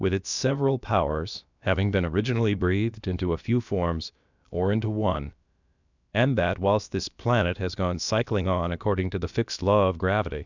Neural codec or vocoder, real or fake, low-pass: codec, 16 kHz, about 1 kbps, DyCAST, with the encoder's durations; fake; 7.2 kHz